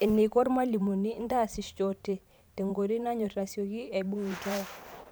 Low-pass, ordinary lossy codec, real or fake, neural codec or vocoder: none; none; fake; vocoder, 44.1 kHz, 128 mel bands every 512 samples, BigVGAN v2